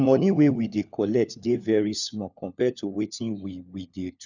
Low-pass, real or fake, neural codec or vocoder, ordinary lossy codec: 7.2 kHz; fake; codec, 16 kHz, 4 kbps, FunCodec, trained on LibriTTS, 50 frames a second; none